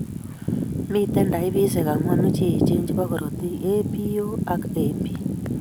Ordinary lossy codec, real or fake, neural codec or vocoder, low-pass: none; real; none; none